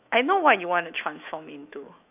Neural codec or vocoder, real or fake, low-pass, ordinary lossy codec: autoencoder, 48 kHz, 128 numbers a frame, DAC-VAE, trained on Japanese speech; fake; 3.6 kHz; none